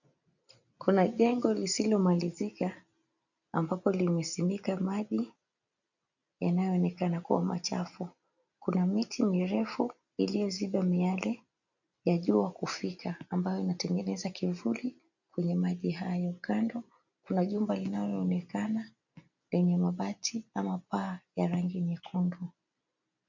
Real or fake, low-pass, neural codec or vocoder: real; 7.2 kHz; none